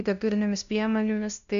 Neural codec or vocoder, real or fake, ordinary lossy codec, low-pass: codec, 16 kHz, 0.5 kbps, FunCodec, trained on LibriTTS, 25 frames a second; fake; AAC, 96 kbps; 7.2 kHz